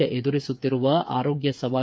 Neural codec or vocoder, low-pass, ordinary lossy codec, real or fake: codec, 16 kHz, 8 kbps, FreqCodec, smaller model; none; none; fake